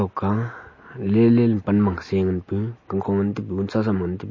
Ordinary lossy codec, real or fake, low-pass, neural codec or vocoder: MP3, 32 kbps; real; 7.2 kHz; none